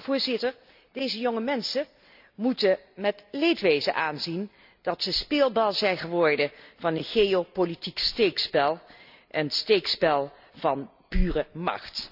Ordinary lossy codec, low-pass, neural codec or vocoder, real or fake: none; 5.4 kHz; none; real